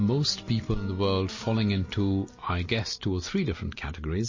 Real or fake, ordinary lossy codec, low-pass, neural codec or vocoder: real; MP3, 32 kbps; 7.2 kHz; none